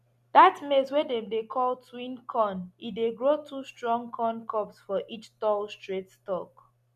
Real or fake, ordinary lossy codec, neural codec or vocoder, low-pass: real; none; none; 14.4 kHz